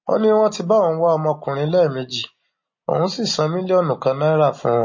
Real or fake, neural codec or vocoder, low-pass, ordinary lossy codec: real; none; 7.2 kHz; MP3, 32 kbps